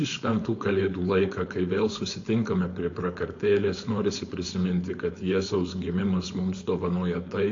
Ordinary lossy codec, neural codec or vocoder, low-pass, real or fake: AAC, 48 kbps; codec, 16 kHz, 4.8 kbps, FACodec; 7.2 kHz; fake